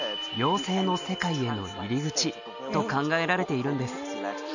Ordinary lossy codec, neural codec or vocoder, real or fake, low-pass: none; none; real; 7.2 kHz